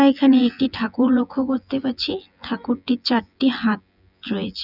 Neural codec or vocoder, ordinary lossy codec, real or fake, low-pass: vocoder, 24 kHz, 100 mel bands, Vocos; none; fake; 5.4 kHz